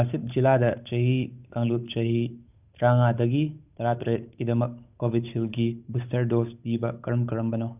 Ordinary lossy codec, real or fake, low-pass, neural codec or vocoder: none; fake; 3.6 kHz; codec, 16 kHz, 8 kbps, FunCodec, trained on Chinese and English, 25 frames a second